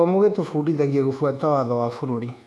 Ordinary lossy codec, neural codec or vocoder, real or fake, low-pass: none; codec, 24 kHz, 1.2 kbps, DualCodec; fake; none